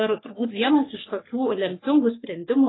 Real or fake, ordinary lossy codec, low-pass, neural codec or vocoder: fake; AAC, 16 kbps; 7.2 kHz; codec, 44.1 kHz, 3.4 kbps, Pupu-Codec